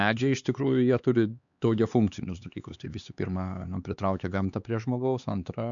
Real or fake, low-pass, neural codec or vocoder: fake; 7.2 kHz; codec, 16 kHz, 4 kbps, X-Codec, HuBERT features, trained on LibriSpeech